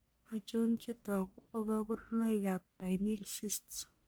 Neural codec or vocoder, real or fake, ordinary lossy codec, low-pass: codec, 44.1 kHz, 1.7 kbps, Pupu-Codec; fake; none; none